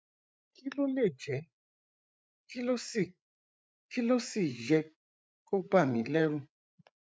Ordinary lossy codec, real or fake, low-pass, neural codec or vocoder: none; fake; none; codec, 16 kHz, 8 kbps, FreqCodec, larger model